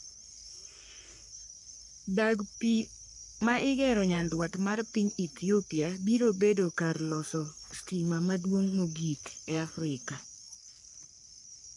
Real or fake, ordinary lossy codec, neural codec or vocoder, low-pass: fake; none; codec, 44.1 kHz, 3.4 kbps, Pupu-Codec; 10.8 kHz